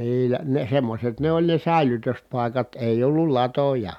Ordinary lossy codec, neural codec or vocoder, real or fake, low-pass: none; none; real; 19.8 kHz